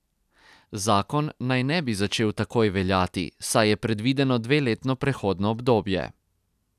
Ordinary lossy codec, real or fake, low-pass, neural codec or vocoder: none; real; 14.4 kHz; none